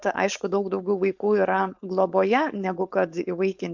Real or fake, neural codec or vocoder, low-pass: fake; codec, 16 kHz, 4.8 kbps, FACodec; 7.2 kHz